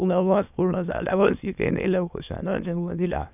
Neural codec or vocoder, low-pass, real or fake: autoencoder, 22.05 kHz, a latent of 192 numbers a frame, VITS, trained on many speakers; 3.6 kHz; fake